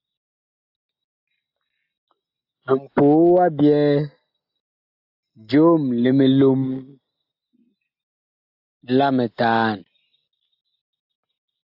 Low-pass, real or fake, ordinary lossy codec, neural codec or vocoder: 5.4 kHz; real; AAC, 48 kbps; none